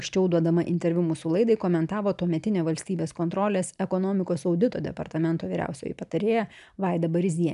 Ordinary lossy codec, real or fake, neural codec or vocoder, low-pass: MP3, 96 kbps; real; none; 10.8 kHz